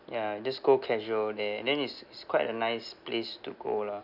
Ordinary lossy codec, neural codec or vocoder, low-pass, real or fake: none; none; 5.4 kHz; real